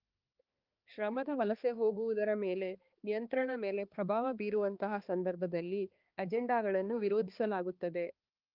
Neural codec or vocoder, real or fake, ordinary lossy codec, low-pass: codec, 16 kHz, 2 kbps, X-Codec, HuBERT features, trained on balanced general audio; fake; Opus, 32 kbps; 5.4 kHz